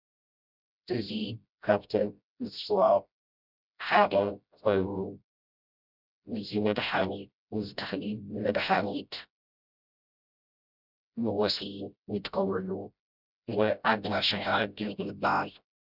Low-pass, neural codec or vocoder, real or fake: 5.4 kHz; codec, 16 kHz, 0.5 kbps, FreqCodec, smaller model; fake